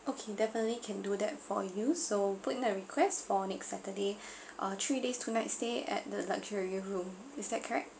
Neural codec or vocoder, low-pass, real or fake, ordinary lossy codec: none; none; real; none